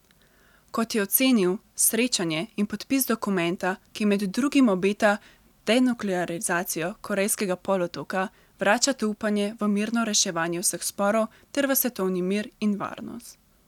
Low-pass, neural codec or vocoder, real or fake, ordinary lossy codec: 19.8 kHz; none; real; none